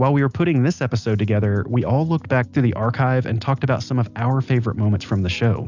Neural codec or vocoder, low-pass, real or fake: none; 7.2 kHz; real